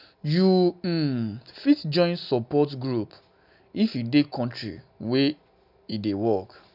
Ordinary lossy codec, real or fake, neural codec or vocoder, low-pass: none; real; none; 5.4 kHz